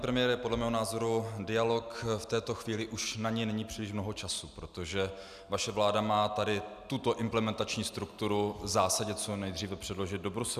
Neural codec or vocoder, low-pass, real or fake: none; 14.4 kHz; real